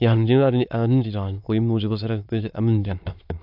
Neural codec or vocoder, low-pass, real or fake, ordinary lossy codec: autoencoder, 22.05 kHz, a latent of 192 numbers a frame, VITS, trained on many speakers; 5.4 kHz; fake; AAC, 48 kbps